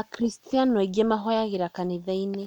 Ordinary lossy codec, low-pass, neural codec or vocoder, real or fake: MP3, 96 kbps; 19.8 kHz; none; real